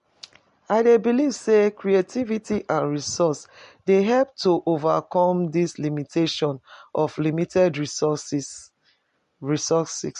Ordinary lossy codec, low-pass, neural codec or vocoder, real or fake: MP3, 48 kbps; 14.4 kHz; none; real